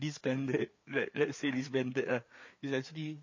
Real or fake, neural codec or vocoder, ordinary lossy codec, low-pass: fake; codec, 16 kHz, 4 kbps, FreqCodec, larger model; MP3, 32 kbps; 7.2 kHz